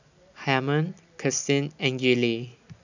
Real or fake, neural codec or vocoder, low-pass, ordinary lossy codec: real; none; 7.2 kHz; none